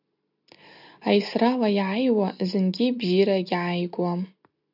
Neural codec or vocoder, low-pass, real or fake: none; 5.4 kHz; real